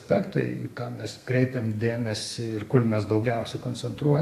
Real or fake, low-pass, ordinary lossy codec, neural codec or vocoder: fake; 14.4 kHz; AAC, 64 kbps; codec, 32 kHz, 1.9 kbps, SNAC